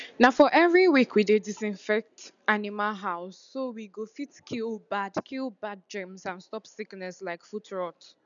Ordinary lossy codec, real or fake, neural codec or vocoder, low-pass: none; real; none; 7.2 kHz